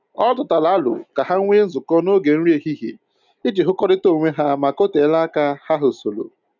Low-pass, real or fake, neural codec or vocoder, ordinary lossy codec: 7.2 kHz; real; none; none